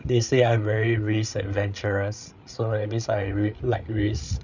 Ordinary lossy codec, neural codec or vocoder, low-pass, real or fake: none; codec, 16 kHz, 8 kbps, FreqCodec, larger model; 7.2 kHz; fake